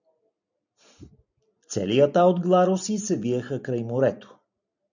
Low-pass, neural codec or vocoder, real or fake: 7.2 kHz; none; real